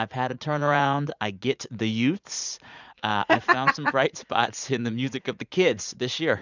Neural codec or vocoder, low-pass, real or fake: vocoder, 44.1 kHz, 80 mel bands, Vocos; 7.2 kHz; fake